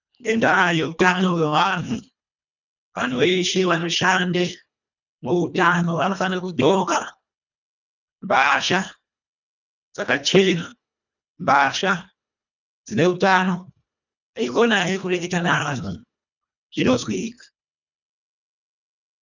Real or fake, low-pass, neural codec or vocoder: fake; 7.2 kHz; codec, 24 kHz, 1.5 kbps, HILCodec